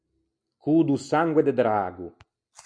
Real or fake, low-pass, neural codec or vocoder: real; 9.9 kHz; none